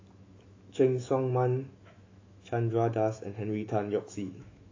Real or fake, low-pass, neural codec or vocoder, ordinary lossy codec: fake; 7.2 kHz; autoencoder, 48 kHz, 128 numbers a frame, DAC-VAE, trained on Japanese speech; AAC, 32 kbps